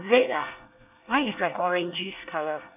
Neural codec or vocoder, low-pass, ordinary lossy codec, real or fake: codec, 24 kHz, 1 kbps, SNAC; 3.6 kHz; none; fake